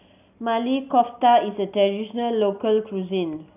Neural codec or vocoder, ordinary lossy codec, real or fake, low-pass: none; none; real; 3.6 kHz